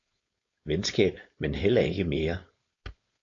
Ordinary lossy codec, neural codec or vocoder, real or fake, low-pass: AAC, 32 kbps; codec, 16 kHz, 4.8 kbps, FACodec; fake; 7.2 kHz